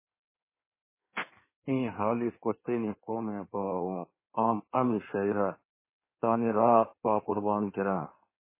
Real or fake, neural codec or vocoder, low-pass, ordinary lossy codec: fake; codec, 16 kHz in and 24 kHz out, 1.1 kbps, FireRedTTS-2 codec; 3.6 kHz; MP3, 16 kbps